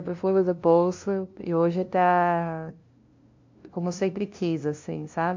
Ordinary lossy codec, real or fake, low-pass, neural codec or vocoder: MP3, 48 kbps; fake; 7.2 kHz; codec, 16 kHz, 0.5 kbps, FunCodec, trained on LibriTTS, 25 frames a second